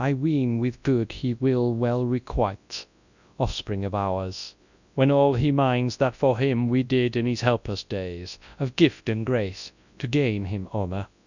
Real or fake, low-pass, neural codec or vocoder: fake; 7.2 kHz; codec, 24 kHz, 0.9 kbps, WavTokenizer, large speech release